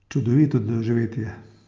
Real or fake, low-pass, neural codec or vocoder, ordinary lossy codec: real; 7.2 kHz; none; Opus, 24 kbps